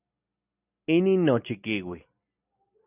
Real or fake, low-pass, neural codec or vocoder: real; 3.6 kHz; none